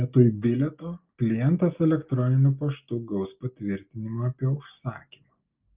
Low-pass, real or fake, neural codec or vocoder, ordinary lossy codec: 3.6 kHz; real; none; Opus, 24 kbps